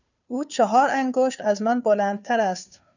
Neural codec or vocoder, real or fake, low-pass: codec, 16 kHz, 2 kbps, FunCodec, trained on Chinese and English, 25 frames a second; fake; 7.2 kHz